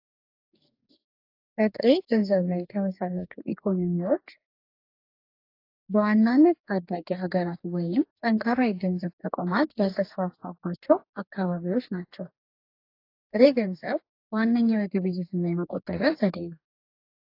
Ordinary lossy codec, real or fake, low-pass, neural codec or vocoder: AAC, 32 kbps; fake; 5.4 kHz; codec, 44.1 kHz, 2.6 kbps, DAC